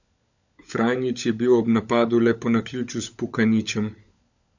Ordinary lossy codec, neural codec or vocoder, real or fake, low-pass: none; codec, 16 kHz, 16 kbps, FunCodec, trained on LibriTTS, 50 frames a second; fake; 7.2 kHz